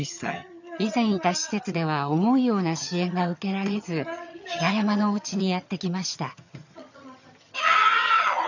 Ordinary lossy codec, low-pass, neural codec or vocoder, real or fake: none; 7.2 kHz; vocoder, 22.05 kHz, 80 mel bands, HiFi-GAN; fake